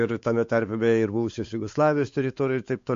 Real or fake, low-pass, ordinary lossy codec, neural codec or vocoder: fake; 7.2 kHz; MP3, 48 kbps; codec, 16 kHz, 4 kbps, X-Codec, WavLM features, trained on Multilingual LibriSpeech